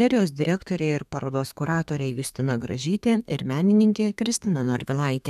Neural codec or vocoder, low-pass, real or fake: codec, 32 kHz, 1.9 kbps, SNAC; 14.4 kHz; fake